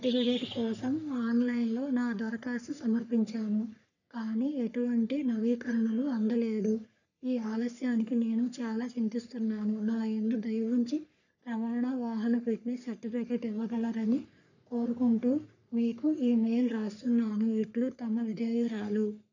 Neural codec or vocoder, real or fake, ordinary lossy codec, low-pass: codec, 44.1 kHz, 3.4 kbps, Pupu-Codec; fake; none; 7.2 kHz